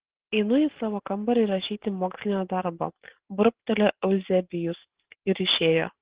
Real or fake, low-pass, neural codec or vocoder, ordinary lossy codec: real; 3.6 kHz; none; Opus, 16 kbps